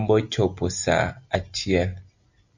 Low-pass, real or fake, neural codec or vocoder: 7.2 kHz; real; none